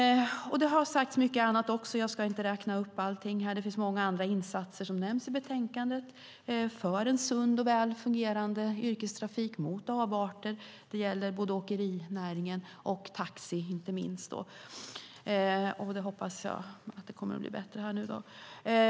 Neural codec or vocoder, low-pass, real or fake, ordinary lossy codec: none; none; real; none